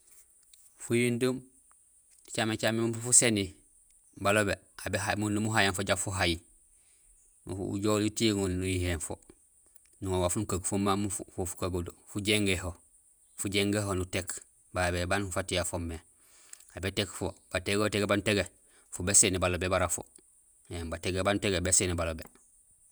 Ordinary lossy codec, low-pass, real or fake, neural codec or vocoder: none; none; fake; vocoder, 48 kHz, 128 mel bands, Vocos